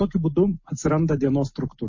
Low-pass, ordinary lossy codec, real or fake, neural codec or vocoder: 7.2 kHz; MP3, 32 kbps; real; none